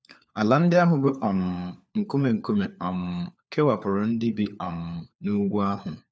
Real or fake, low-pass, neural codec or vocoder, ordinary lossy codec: fake; none; codec, 16 kHz, 4 kbps, FunCodec, trained on LibriTTS, 50 frames a second; none